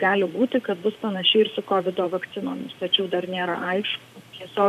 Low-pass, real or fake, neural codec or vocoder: 14.4 kHz; fake; vocoder, 44.1 kHz, 128 mel bands, Pupu-Vocoder